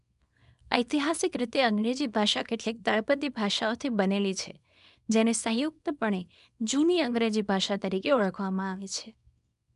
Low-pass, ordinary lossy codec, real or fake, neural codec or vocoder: 10.8 kHz; none; fake; codec, 24 kHz, 0.9 kbps, WavTokenizer, small release